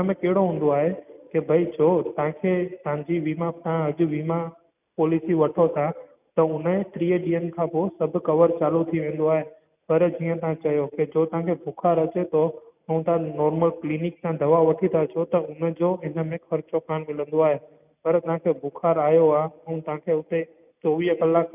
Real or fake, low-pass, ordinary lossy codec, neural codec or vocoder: real; 3.6 kHz; none; none